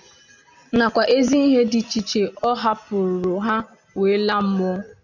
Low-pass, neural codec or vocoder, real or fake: 7.2 kHz; none; real